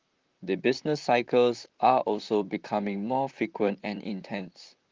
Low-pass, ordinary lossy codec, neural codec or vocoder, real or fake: 7.2 kHz; Opus, 32 kbps; vocoder, 44.1 kHz, 128 mel bands, Pupu-Vocoder; fake